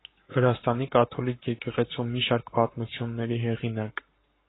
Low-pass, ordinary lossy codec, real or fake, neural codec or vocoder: 7.2 kHz; AAC, 16 kbps; fake; codec, 44.1 kHz, 7.8 kbps, Pupu-Codec